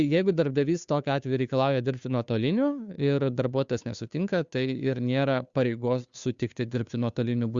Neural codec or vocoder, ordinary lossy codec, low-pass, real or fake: codec, 16 kHz, 2 kbps, FunCodec, trained on Chinese and English, 25 frames a second; Opus, 64 kbps; 7.2 kHz; fake